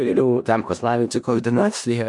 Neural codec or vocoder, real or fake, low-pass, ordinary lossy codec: codec, 16 kHz in and 24 kHz out, 0.4 kbps, LongCat-Audio-Codec, four codebook decoder; fake; 10.8 kHz; MP3, 64 kbps